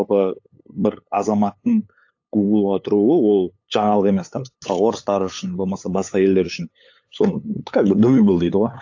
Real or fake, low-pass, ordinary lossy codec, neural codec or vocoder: fake; 7.2 kHz; AAC, 48 kbps; codec, 16 kHz, 8 kbps, FunCodec, trained on LibriTTS, 25 frames a second